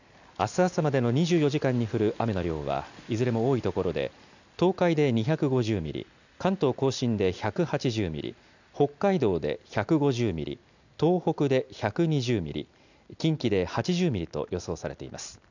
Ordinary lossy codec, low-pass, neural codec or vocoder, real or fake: none; 7.2 kHz; none; real